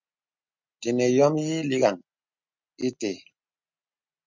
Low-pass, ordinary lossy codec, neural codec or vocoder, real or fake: 7.2 kHz; MP3, 64 kbps; none; real